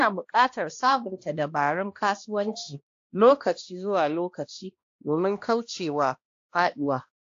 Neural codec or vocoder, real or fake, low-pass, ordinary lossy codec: codec, 16 kHz, 1 kbps, X-Codec, HuBERT features, trained on balanced general audio; fake; 7.2 kHz; AAC, 48 kbps